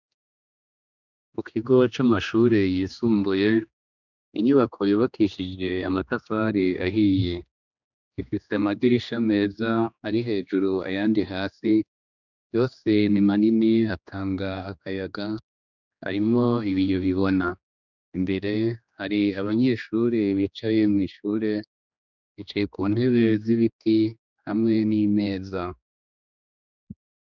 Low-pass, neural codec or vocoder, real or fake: 7.2 kHz; codec, 16 kHz, 2 kbps, X-Codec, HuBERT features, trained on general audio; fake